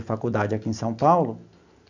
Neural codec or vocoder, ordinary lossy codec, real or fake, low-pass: none; none; real; 7.2 kHz